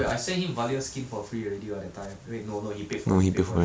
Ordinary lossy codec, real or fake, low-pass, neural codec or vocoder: none; real; none; none